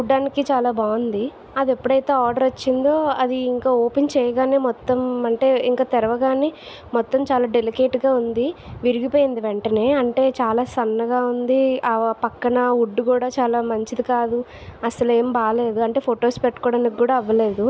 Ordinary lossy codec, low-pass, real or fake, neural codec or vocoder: none; none; real; none